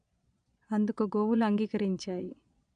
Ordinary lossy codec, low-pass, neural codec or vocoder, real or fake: none; 9.9 kHz; vocoder, 22.05 kHz, 80 mel bands, Vocos; fake